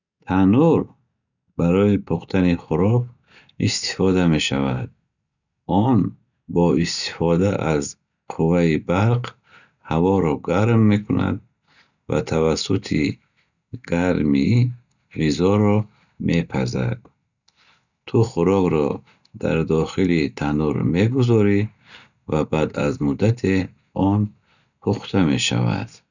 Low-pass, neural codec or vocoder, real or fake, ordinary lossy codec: 7.2 kHz; none; real; none